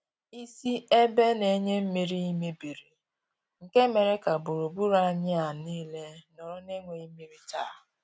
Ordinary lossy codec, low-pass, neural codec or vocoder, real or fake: none; none; none; real